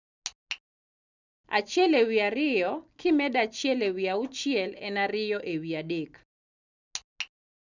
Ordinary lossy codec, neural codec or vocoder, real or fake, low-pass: none; none; real; 7.2 kHz